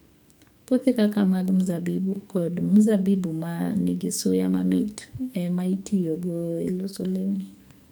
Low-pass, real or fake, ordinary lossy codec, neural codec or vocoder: none; fake; none; codec, 44.1 kHz, 2.6 kbps, SNAC